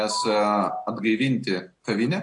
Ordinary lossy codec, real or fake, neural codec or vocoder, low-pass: AAC, 64 kbps; real; none; 10.8 kHz